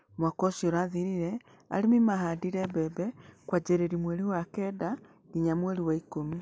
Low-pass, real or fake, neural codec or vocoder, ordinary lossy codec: none; real; none; none